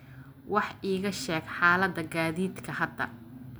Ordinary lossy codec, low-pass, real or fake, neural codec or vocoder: none; none; real; none